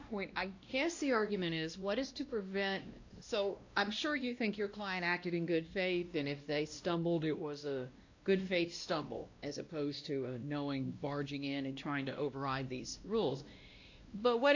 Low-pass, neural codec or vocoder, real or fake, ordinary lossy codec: 7.2 kHz; codec, 16 kHz, 1 kbps, X-Codec, WavLM features, trained on Multilingual LibriSpeech; fake; AAC, 48 kbps